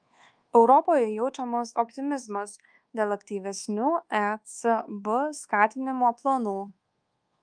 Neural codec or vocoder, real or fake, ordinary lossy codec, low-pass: codec, 24 kHz, 1.2 kbps, DualCodec; fake; Opus, 32 kbps; 9.9 kHz